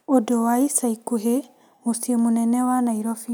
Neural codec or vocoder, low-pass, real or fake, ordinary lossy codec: none; none; real; none